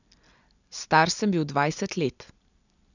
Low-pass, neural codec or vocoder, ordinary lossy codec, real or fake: 7.2 kHz; none; none; real